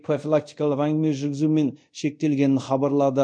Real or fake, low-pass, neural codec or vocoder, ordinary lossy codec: fake; 9.9 kHz; codec, 24 kHz, 0.5 kbps, DualCodec; MP3, 48 kbps